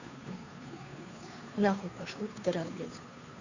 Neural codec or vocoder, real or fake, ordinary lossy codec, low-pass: codec, 16 kHz, 2 kbps, FunCodec, trained on Chinese and English, 25 frames a second; fake; none; 7.2 kHz